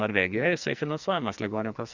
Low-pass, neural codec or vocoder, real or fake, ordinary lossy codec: 7.2 kHz; codec, 44.1 kHz, 2.6 kbps, SNAC; fake; Opus, 64 kbps